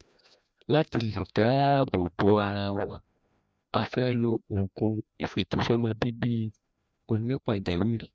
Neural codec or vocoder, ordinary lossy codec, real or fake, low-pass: codec, 16 kHz, 1 kbps, FreqCodec, larger model; none; fake; none